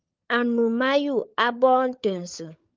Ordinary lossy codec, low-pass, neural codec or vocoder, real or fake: Opus, 24 kbps; 7.2 kHz; codec, 16 kHz, 16 kbps, FunCodec, trained on LibriTTS, 50 frames a second; fake